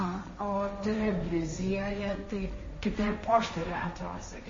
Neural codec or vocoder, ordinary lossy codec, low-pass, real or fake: codec, 16 kHz, 1.1 kbps, Voila-Tokenizer; MP3, 32 kbps; 7.2 kHz; fake